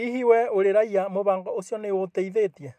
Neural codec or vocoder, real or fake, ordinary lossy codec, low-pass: none; real; MP3, 96 kbps; 14.4 kHz